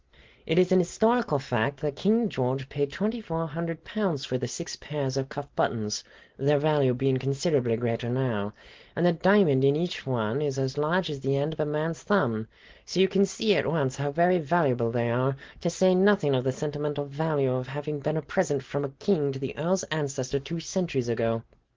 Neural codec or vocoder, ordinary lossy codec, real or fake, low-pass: none; Opus, 16 kbps; real; 7.2 kHz